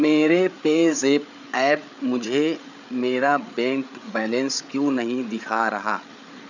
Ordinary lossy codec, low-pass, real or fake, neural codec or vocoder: none; 7.2 kHz; fake; codec, 16 kHz, 8 kbps, FreqCodec, larger model